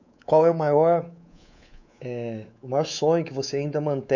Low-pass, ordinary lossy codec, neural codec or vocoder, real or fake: 7.2 kHz; none; codec, 24 kHz, 3.1 kbps, DualCodec; fake